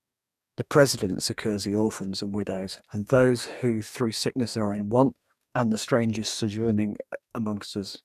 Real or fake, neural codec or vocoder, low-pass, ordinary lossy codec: fake; codec, 44.1 kHz, 2.6 kbps, DAC; 14.4 kHz; none